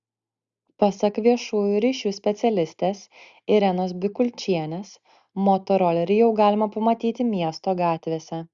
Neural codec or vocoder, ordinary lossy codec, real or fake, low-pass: none; Opus, 64 kbps; real; 7.2 kHz